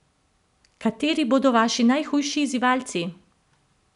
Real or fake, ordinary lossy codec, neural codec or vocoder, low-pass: real; none; none; 10.8 kHz